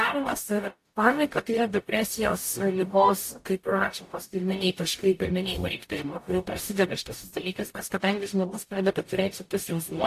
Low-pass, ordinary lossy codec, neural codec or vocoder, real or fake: 14.4 kHz; AAC, 64 kbps; codec, 44.1 kHz, 0.9 kbps, DAC; fake